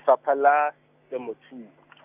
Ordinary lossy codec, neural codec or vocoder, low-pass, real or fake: none; none; 3.6 kHz; real